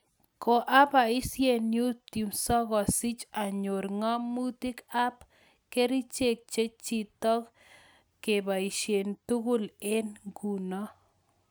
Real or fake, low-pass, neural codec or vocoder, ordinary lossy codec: real; none; none; none